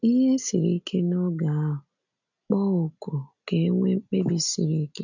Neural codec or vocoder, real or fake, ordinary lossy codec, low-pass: none; real; none; 7.2 kHz